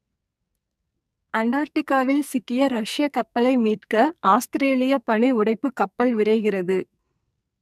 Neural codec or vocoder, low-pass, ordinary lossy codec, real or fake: codec, 44.1 kHz, 2.6 kbps, SNAC; 14.4 kHz; MP3, 96 kbps; fake